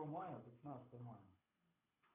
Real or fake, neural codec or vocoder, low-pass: fake; codec, 24 kHz, 6 kbps, HILCodec; 3.6 kHz